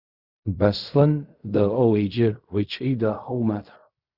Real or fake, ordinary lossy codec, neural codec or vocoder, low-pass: fake; Opus, 64 kbps; codec, 16 kHz in and 24 kHz out, 0.4 kbps, LongCat-Audio-Codec, fine tuned four codebook decoder; 5.4 kHz